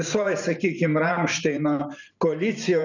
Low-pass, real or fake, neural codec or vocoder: 7.2 kHz; fake; vocoder, 44.1 kHz, 128 mel bands every 512 samples, BigVGAN v2